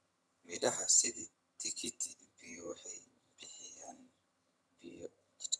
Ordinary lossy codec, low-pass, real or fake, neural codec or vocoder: none; none; fake; vocoder, 22.05 kHz, 80 mel bands, HiFi-GAN